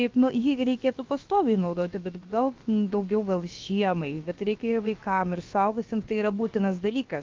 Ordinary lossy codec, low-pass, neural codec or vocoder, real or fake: Opus, 24 kbps; 7.2 kHz; codec, 16 kHz, about 1 kbps, DyCAST, with the encoder's durations; fake